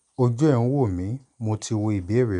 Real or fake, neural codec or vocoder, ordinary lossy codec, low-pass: real; none; none; 10.8 kHz